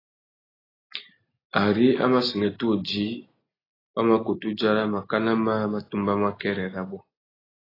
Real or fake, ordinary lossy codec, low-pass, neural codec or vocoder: real; AAC, 24 kbps; 5.4 kHz; none